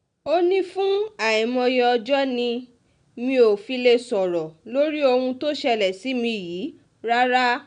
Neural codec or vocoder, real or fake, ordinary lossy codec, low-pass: none; real; none; 9.9 kHz